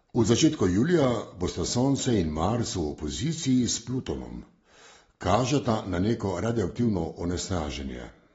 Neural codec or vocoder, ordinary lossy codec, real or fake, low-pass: none; AAC, 24 kbps; real; 19.8 kHz